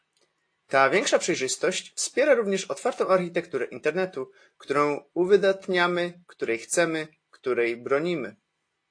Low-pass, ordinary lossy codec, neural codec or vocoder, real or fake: 9.9 kHz; AAC, 48 kbps; none; real